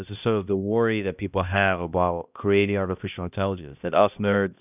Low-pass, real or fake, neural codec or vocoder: 3.6 kHz; fake; codec, 16 kHz, 1 kbps, X-Codec, HuBERT features, trained on balanced general audio